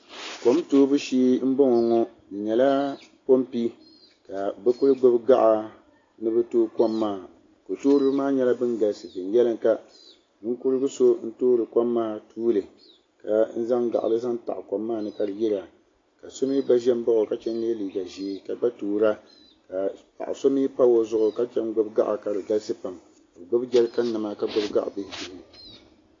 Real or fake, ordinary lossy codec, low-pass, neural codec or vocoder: real; AAC, 32 kbps; 7.2 kHz; none